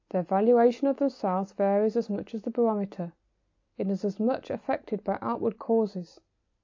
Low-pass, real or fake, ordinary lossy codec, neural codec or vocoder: 7.2 kHz; real; AAC, 48 kbps; none